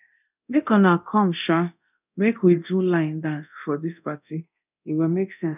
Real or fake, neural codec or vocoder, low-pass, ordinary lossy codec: fake; codec, 24 kHz, 0.9 kbps, DualCodec; 3.6 kHz; none